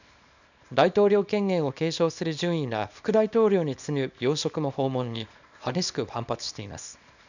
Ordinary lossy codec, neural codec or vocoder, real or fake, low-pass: none; codec, 24 kHz, 0.9 kbps, WavTokenizer, small release; fake; 7.2 kHz